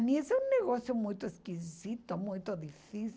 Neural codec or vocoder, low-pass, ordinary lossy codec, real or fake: none; none; none; real